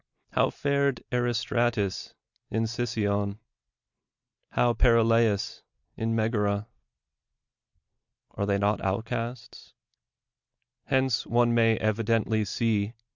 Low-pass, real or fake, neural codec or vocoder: 7.2 kHz; real; none